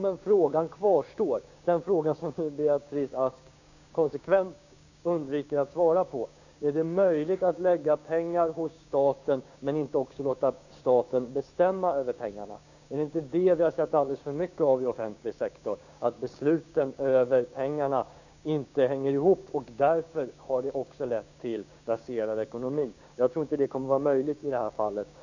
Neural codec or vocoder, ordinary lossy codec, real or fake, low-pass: codec, 16 kHz, 6 kbps, DAC; none; fake; 7.2 kHz